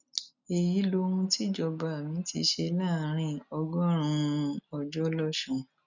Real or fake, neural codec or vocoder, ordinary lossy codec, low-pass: real; none; none; 7.2 kHz